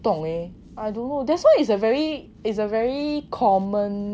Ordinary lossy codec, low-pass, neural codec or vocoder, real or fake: none; none; none; real